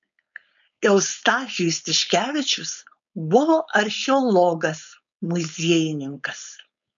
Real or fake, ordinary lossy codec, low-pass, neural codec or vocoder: fake; MP3, 96 kbps; 7.2 kHz; codec, 16 kHz, 4.8 kbps, FACodec